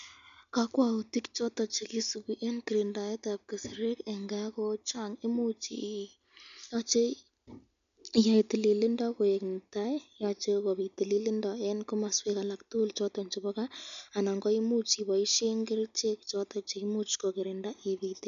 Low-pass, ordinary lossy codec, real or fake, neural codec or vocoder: 7.2 kHz; none; real; none